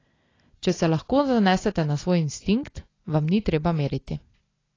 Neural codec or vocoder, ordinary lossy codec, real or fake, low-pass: none; AAC, 32 kbps; real; 7.2 kHz